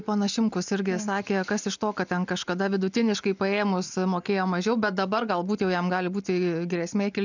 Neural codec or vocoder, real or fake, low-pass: none; real; 7.2 kHz